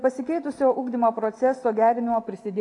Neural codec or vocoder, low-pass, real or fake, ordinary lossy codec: none; 10.8 kHz; real; AAC, 48 kbps